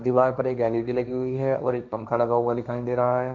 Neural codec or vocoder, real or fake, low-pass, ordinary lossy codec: codec, 16 kHz, 1.1 kbps, Voila-Tokenizer; fake; none; none